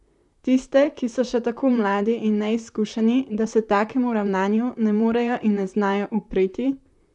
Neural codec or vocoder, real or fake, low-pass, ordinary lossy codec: vocoder, 44.1 kHz, 128 mel bands, Pupu-Vocoder; fake; 10.8 kHz; none